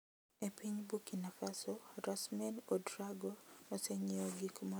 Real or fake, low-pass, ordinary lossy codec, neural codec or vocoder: real; none; none; none